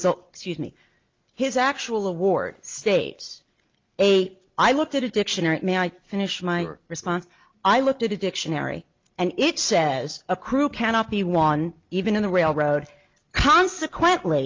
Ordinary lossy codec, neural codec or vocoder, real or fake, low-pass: Opus, 32 kbps; none; real; 7.2 kHz